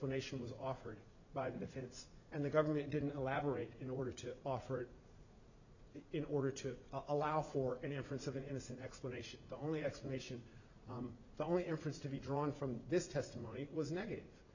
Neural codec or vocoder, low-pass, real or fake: vocoder, 44.1 kHz, 80 mel bands, Vocos; 7.2 kHz; fake